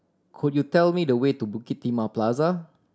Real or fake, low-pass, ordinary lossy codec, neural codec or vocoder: real; none; none; none